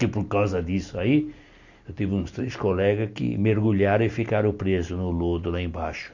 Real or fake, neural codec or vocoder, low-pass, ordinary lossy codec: real; none; 7.2 kHz; none